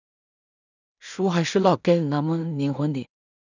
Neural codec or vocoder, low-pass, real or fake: codec, 16 kHz in and 24 kHz out, 0.4 kbps, LongCat-Audio-Codec, two codebook decoder; 7.2 kHz; fake